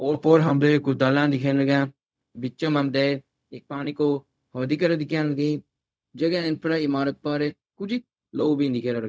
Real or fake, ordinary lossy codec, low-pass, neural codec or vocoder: fake; none; none; codec, 16 kHz, 0.4 kbps, LongCat-Audio-Codec